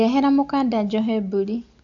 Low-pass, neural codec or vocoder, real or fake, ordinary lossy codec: 7.2 kHz; none; real; AAC, 48 kbps